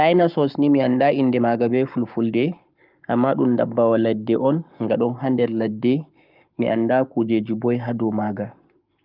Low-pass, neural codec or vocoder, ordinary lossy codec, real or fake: 5.4 kHz; codec, 44.1 kHz, 7.8 kbps, Pupu-Codec; Opus, 24 kbps; fake